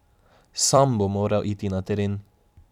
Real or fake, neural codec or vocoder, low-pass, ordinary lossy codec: fake; vocoder, 44.1 kHz, 128 mel bands every 256 samples, BigVGAN v2; 19.8 kHz; none